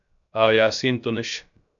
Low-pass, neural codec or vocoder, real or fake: 7.2 kHz; codec, 16 kHz, 0.3 kbps, FocalCodec; fake